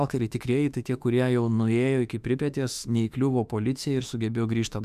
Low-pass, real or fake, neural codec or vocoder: 14.4 kHz; fake; autoencoder, 48 kHz, 32 numbers a frame, DAC-VAE, trained on Japanese speech